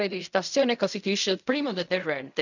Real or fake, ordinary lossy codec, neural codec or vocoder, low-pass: fake; none; codec, 16 kHz in and 24 kHz out, 0.4 kbps, LongCat-Audio-Codec, fine tuned four codebook decoder; 7.2 kHz